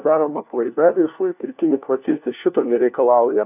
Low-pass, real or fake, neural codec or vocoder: 3.6 kHz; fake; codec, 16 kHz, 1 kbps, FunCodec, trained on LibriTTS, 50 frames a second